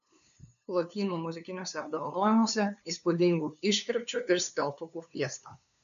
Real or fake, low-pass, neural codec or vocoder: fake; 7.2 kHz; codec, 16 kHz, 2 kbps, FunCodec, trained on LibriTTS, 25 frames a second